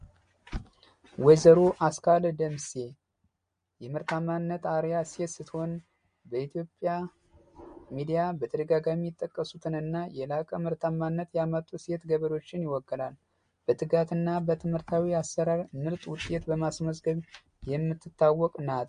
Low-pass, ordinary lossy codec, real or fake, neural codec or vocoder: 9.9 kHz; MP3, 48 kbps; real; none